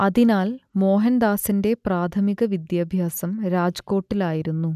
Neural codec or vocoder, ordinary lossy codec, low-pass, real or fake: none; none; 14.4 kHz; real